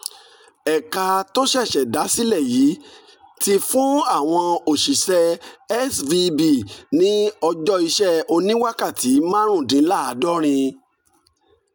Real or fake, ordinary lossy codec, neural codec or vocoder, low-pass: real; none; none; none